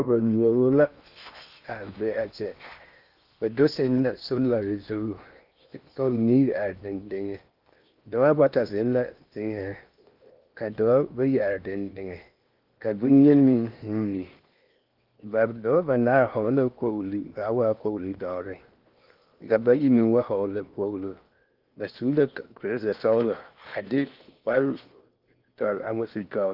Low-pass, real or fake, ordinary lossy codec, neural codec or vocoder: 5.4 kHz; fake; Opus, 32 kbps; codec, 16 kHz in and 24 kHz out, 0.8 kbps, FocalCodec, streaming, 65536 codes